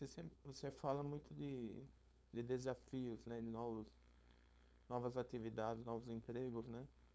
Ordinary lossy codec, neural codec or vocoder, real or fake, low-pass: none; codec, 16 kHz, 4.8 kbps, FACodec; fake; none